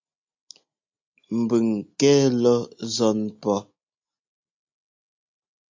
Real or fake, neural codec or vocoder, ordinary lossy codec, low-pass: real; none; MP3, 64 kbps; 7.2 kHz